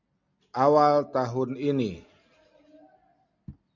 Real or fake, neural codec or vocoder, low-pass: real; none; 7.2 kHz